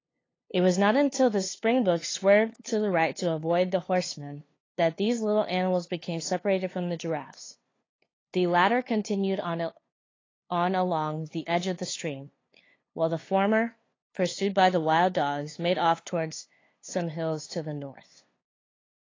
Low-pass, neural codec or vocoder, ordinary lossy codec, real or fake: 7.2 kHz; codec, 16 kHz, 2 kbps, FunCodec, trained on LibriTTS, 25 frames a second; AAC, 32 kbps; fake